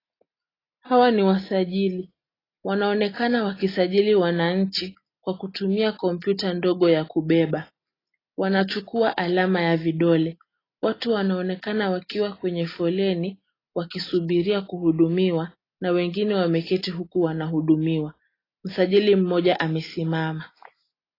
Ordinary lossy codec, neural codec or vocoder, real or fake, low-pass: AAC, 24 kbps; none; real; 5.4 kHz